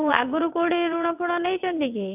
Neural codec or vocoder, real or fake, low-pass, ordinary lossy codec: vocoder, 22.05 kHz, 80 mel bands, WaveNeXt; fake; 3.6 kHz; none